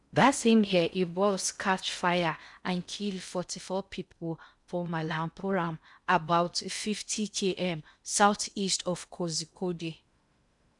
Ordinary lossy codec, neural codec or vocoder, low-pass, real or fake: none; codec, 16 kHz in and 24 kHz out, 0.6 kbps, FocalCodec, streaming, 4096 codes; 10.8 kHz; fake